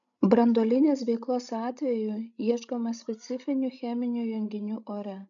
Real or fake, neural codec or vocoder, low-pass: fake; codec, 16 kHz, 16 kbps, FreqCodec, larger model; 7.2 kHz